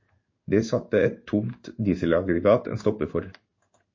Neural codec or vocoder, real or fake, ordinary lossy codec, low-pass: codec, 16 kHz in and 24 kHz out, 1 kbps, XY-Tokenizer; fake; MP3, 32 kbps; 7.2 kHz